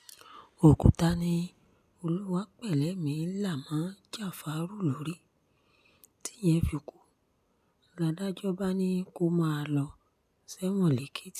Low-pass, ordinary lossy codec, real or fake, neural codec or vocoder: 14.4 kHz; none; real; none